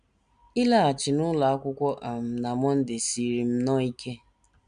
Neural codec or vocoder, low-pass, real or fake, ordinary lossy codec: none; 9.9 kHz; real; none